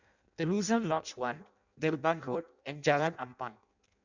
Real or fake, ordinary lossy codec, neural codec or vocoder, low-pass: fake; none; codec, 16 kHz in and 24 kHz out, 0.6 kbps, FireRedTTS-2 codec; 7.2 kHz